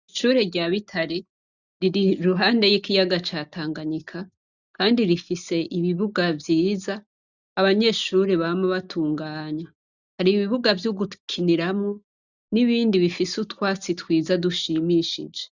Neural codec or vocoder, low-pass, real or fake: none; 7.2 kHz; real